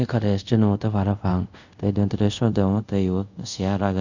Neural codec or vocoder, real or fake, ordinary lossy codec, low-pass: codec, 24 kHz, 0.5 kbps, DualCodec; fake; none; 7.2 kHz